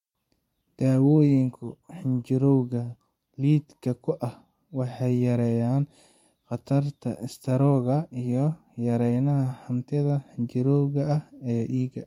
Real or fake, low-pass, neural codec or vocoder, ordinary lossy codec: fake; 19.8 kHz; vocoder, 44.1 kHz, 128 mel bands every 512 samples, BigVGAN v2; MP3, 64 kbps